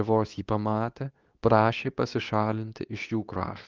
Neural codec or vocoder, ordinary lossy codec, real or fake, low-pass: codec, 24 kHz, 0.9 kbps, WavTokenizer, medium speech release version 2; Opus, 24 kbps; fake; 7.2 kHz